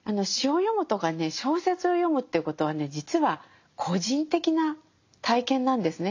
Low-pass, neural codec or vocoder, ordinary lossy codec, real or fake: 7.2 kHz; none; AAC, 48 kbps; real